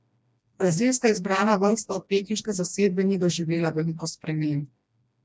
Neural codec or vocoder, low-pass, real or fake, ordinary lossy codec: codec, 16 kHz, 1 kbps, FreqCodec, smaller model; none; fake; none